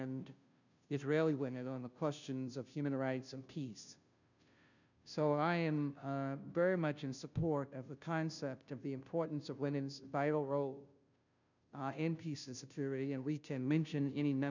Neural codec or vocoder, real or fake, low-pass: codec, 16 kHz, 0.5 kbps, FunCodec, trained on Chinese and English, 25 frames a second; fake; 7.2 kHz